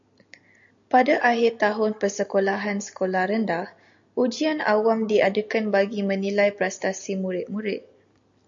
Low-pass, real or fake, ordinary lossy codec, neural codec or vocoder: 7.2 kHz; real; AAC, 64 kbps; none